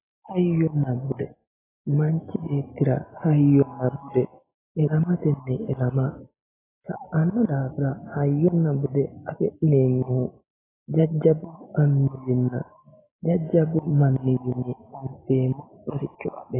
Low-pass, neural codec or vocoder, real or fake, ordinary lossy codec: 3.6 kHz; none; real; AAC, 16 kbps